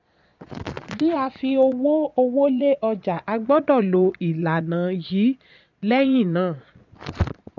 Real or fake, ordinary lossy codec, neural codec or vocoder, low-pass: fake; none; vocoder, 22.05 kHz, 80 mel bands, Vocos; 7.2 kHz